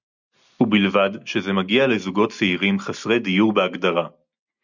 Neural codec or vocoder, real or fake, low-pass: none; real; 7.2 kHz